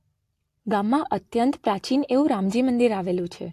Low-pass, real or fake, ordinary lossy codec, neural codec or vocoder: 14.4 kHz; real; AAC, 64 kbps; none